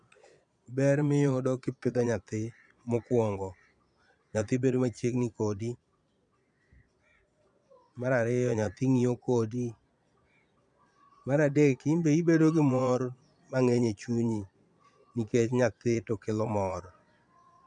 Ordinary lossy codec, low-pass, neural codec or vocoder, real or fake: none; 9.9 kHz; vocoder, 22.05 kHz, 80 mel bands, Vocos; fake